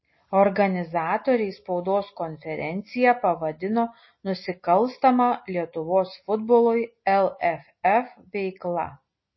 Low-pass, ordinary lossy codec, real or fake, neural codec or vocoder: 7.2 kHz; MP3, 24 kbps; real; none